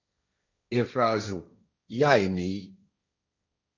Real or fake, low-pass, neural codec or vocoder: fake; 7.2 kHz; codec, 16 kHz, 1.1 kbps, Voila-Tokenizer